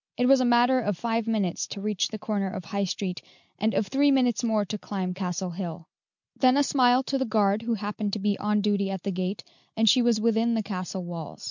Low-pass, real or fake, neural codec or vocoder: 7.2 kHz; real; none